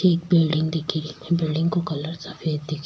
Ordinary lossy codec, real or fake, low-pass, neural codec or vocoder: none; real; none; none